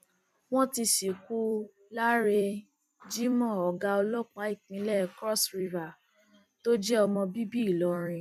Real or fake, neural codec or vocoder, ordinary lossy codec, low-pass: fake; vocoder, 44.1 kHz, 128 mel bands every 256 samples, BigVGAN v2; none; 14.4 kHz